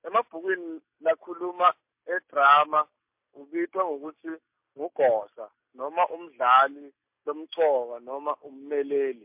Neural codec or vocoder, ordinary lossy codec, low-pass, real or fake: none; MP3, 32 kbps; 3.6 kHz; real